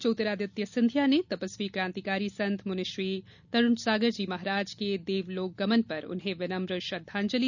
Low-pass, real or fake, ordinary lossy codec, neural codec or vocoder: 7.2 kHz; real; none; none